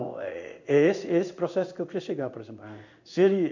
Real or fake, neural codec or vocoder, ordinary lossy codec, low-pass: fake; codec, 16 kHz in and 24 kHz out, 1 kbps, XY-Tokenizer; none; 7.2 kHz